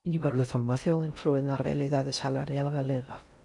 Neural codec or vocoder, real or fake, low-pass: codec, 16 kHz in and 24 kHz out, 0.6 kbps, FocalCodec, streaming, 4096 codes; fake; 10.8 kHz